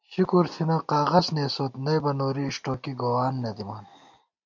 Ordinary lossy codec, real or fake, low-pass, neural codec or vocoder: MP3, 64 kbps; real; 7.2 kHz; none